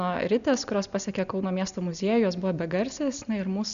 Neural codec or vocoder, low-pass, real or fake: none; 7.2 kHz; real